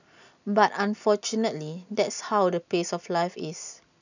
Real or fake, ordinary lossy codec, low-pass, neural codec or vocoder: real; none; 7.2 kHz; none